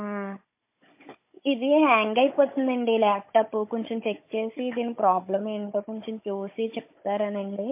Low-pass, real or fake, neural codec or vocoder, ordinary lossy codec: 3.6 kHz; fake; codec, 16 kHz, 16 kbps, FunCodec, trained on Chinese and English, 50 frames a second; MP3, 24 kbps